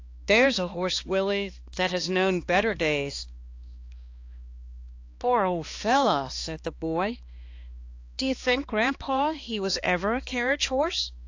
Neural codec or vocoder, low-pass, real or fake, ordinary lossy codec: codec, 16 kHz, 2 kbps, X-Codec, HuBERT features, trained on balanced general audio; 7.2 kHz; fake; AAC, 48 kbps